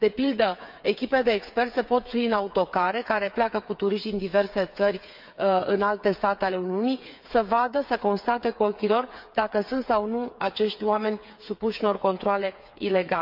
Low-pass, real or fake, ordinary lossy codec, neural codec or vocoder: 5.4 kHz; fake; none; codec, 16 kHz, 8 kbps, FreqCodec, smaller model